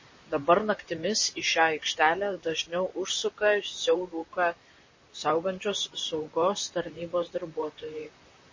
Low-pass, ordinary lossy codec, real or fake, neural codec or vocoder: 7.2 kHz; MP3, 32 kbps; fake; vocoder, 44.1 kHz, 128 mel bands, Pupu-Vocoder